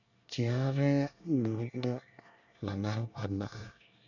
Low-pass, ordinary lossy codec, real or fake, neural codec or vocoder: 7.2 kHz; none; fake; codec, 24 kHz, 1 kbps, SNAC